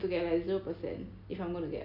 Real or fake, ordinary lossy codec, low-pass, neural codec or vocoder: real; none; 5.4 kHz; none